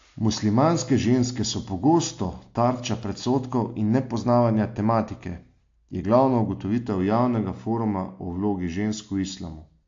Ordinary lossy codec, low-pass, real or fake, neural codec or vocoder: AAC, 48 kbps; 7.2 kHz; real; none